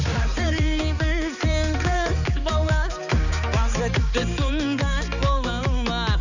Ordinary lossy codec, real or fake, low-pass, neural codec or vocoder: none; fake; 7.2 kHz; codec, 16 kHz, 4 kbps, X-Codec, HuBERT features, trained on balanced general audio